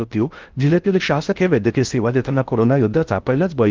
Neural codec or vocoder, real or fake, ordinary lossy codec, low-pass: codec, 16 kHz in and 24 kHz out, 0.6 kbps, FocalCodec, streaming, 4096 codes; fake; Opus, 32 kbps; 7.2 kHz